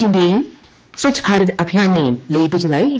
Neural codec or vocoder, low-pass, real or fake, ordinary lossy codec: codec, 16 kHz, 2 kbps, X-Codec, HuBERT features, trained on general audio; none; fake; none